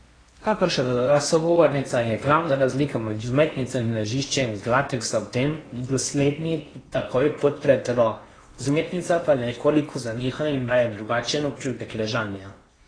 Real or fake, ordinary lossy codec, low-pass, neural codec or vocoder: fake; AAC, 32 kbps; 9.9 kHz; codec, 16 kHz in and 24 kHz out, 0.8 kbps, FocalCodec, streaming, 65536 codes